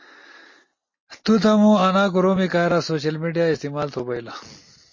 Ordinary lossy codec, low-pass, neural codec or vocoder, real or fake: MP3, 32 kbps; 7.2 kHz; none; real